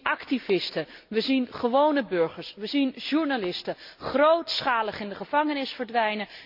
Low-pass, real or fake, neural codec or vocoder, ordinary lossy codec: 5.4 kHz; real; none; MP3, 48 kbps